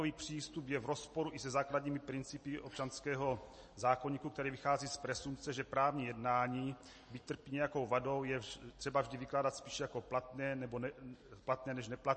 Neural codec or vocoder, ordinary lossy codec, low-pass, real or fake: none; MP3, 32 kbps; 9.9 kHz; real